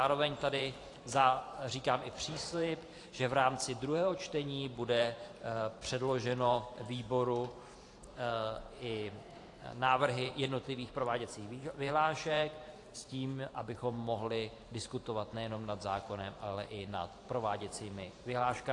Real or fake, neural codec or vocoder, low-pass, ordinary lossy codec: fake; vocoder, 44.1 kHz, 128 mel bands every 512 samples, BigVGAN v2; 10.8 kHz; AAC, 48 kbps